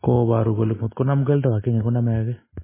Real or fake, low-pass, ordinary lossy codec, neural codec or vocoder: real; 3.6 kHz; MP3, 16 kbps; none